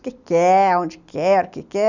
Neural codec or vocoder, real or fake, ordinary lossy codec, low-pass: none; real; none; 7.2 kHz